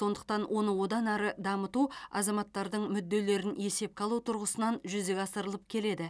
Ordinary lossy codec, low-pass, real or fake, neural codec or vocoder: none; none; real; none